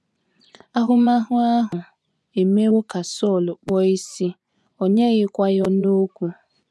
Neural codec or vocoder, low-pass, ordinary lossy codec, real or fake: vocoder, 24 kHz, 100 mel bands, Vocos; none; none; fake